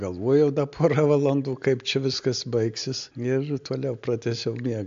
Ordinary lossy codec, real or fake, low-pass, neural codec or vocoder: AAC, 64 kbps; real; 7.2 kHz; none